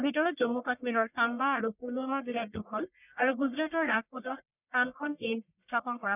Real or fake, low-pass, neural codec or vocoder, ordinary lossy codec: fake; 3.6 kHz; codec, 44.1 kHz, 1.7 kbps, Pupu-Codec; none